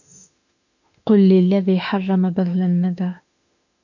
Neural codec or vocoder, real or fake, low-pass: autoencoder, 48 kHz, 32 numbers a frame, DAC-VAE, trained on Japanese speech; fake; 7.2 kHz